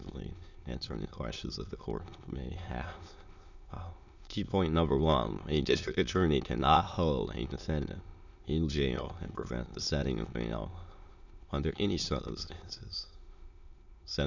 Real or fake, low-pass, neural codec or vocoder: fake; 7.2 kHz; autoencoder, 22.05 kHz, a latent of 192 numbers a frame, VITS, trained on many speakers